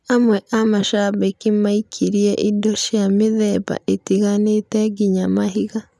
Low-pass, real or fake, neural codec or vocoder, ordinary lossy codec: none; real; none; none